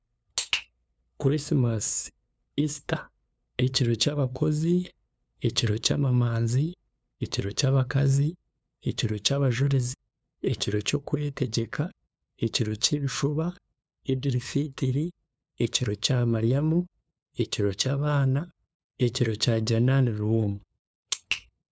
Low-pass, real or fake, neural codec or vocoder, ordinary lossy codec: none; fake; codec, 16 kHz, 2 kbps, FunCodec, trained on LibriTTS, 25 frames a second; none